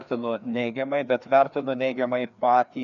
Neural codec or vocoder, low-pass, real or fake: codec, 16 kHz, 2 kbps, FreqCodec, larger model; 7.2 kHz; fake